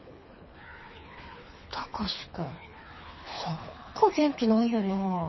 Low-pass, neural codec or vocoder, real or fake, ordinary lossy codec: 7.2 kHz; codec, 24 kHz, 3 kbps, HILCodec; fake; MP3, 24 kbps